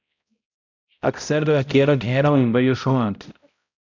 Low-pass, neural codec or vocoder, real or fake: 7.2 kHz; codec, 16 kHz, 0.5 kbps, X-Codec, HuBERT features, trained on balanced general audio; fake